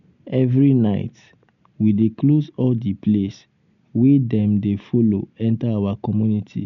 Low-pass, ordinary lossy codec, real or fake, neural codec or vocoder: 7.2 kHz; none; real; none